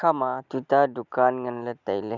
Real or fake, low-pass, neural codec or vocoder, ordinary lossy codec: real; 7.2 kHz; none; none